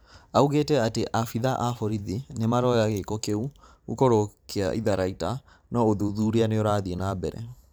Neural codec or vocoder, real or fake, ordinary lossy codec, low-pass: vocoder, 44.1 kHz, 128 mel bands every 512 samples, BigVGAN v2; fake; none; none